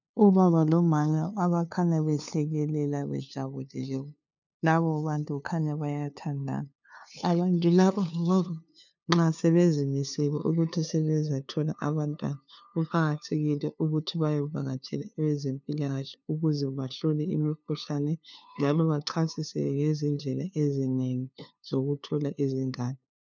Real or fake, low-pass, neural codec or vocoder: fake; 7.2 kHz; codec, 16 kHz, 2 kbps, FunCodec, trained on LibriTTS, 25 frames a second